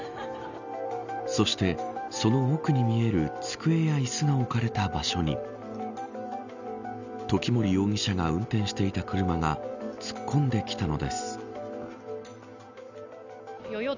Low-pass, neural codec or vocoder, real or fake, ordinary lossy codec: 7.2 kHz; none; real; none